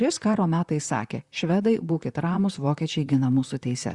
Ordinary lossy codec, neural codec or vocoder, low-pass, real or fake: Opus, 64 kbps; vocoder, 44.1 kHz, 128 mel bands, Pupu-Vocoder; 10.8 kHz; fake